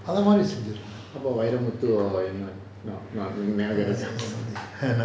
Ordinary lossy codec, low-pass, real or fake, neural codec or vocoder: none; none; real; none